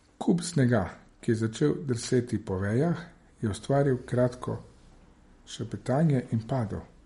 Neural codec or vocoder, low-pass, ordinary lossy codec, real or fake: none; 19.8 kHz; MP3, 48 kbps; real